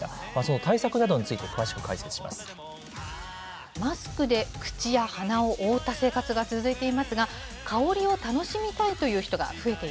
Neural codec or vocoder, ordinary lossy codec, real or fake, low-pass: none; none; real; none